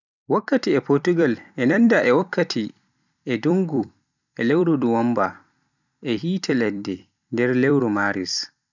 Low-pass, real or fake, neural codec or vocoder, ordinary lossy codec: 7.2 kHz; fake; vocoder, 44.1 kHz, 128 mel bands every 256 samples, BigVGAN v2; none